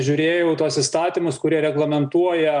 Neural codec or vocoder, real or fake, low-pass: none; real; 9.9 kHz